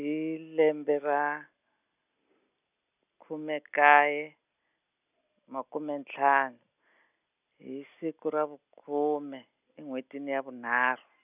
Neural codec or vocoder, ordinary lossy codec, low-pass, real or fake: none; none; 3.6 kHz; real